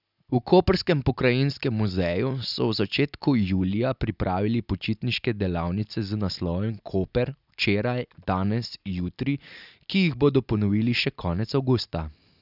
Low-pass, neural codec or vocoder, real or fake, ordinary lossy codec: 5.4 kHz; none; real; none